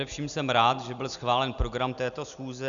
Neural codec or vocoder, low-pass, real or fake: none; 7.2 kHz; real